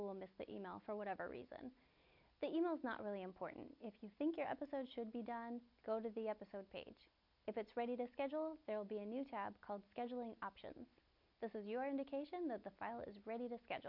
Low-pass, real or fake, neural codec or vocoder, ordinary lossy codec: 5.4 kHz; real; none; MP3, 48 kbps